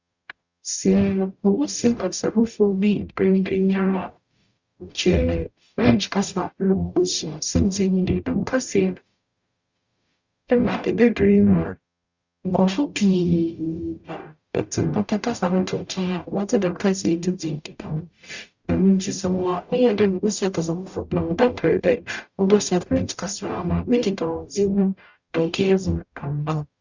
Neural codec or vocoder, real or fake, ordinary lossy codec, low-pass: codec, 44.1 kHz, 0.9 kbps, DAC; fake; Opus, 64 kbps; 7.2 kHz